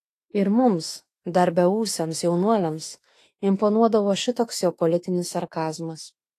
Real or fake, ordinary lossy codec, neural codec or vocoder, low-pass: fake; AAC, 48 kbps; autoencoder, 48 kHz, 32 numbers a frame, DAC-VAE, trained on Japanese speech; 14.4 kHz